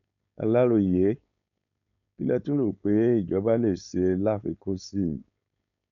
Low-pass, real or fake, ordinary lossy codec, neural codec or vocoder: 7.2 kHz; fake; none; codec, 16 kHz, 4.8 kbps, FACodec